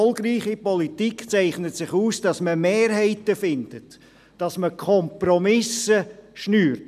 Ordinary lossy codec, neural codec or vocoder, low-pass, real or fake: none; none; 14.4 kHz; real